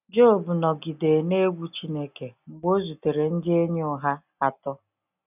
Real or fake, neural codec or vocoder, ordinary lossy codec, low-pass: real; none; none; 3.6 kHz